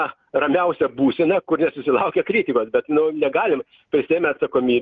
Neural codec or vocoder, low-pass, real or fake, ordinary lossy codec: none; 7.2 kHz; real; Opus, 32 kbps